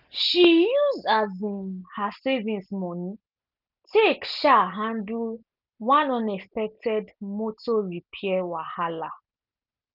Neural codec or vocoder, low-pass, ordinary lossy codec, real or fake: none; 5.4 kHz; none; real